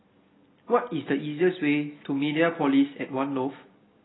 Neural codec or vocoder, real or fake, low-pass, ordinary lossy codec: none; real; 7.2 kHz; AAC, 16 kbps